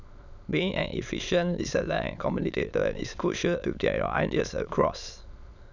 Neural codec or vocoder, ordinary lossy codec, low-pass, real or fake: autoencoder, 22.05 kHz, a latent of 192 numbers a frame, VITS, trained on many speakers; none; 7.2 kHz; fake